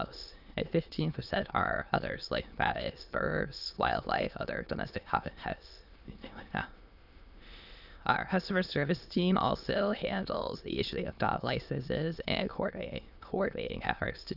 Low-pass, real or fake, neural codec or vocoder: 5.4 kHz; fake; autoencoder, 22.05 kHz, a latent of 192 numbers a frame, VITS, trained on many speakers